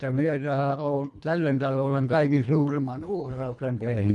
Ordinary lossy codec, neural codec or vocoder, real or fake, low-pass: none; codec, 24 kHz, 1.5 kbps, HILCodec; fake; none